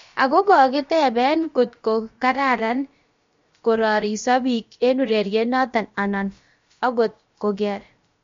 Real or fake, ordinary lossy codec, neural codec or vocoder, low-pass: fake; MP3, 48 kbps; codec, 16 kHz, about 1 kbps, DyCAST, with the encoder's durations; 7.2 kHz